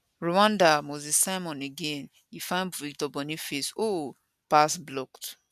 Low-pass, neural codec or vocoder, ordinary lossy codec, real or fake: 14.4 kHz; none; none; real